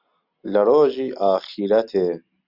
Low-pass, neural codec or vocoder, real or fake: 5.4 kHz; none; real